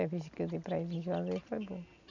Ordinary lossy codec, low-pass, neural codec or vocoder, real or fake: none; 7.2 kHz; none; real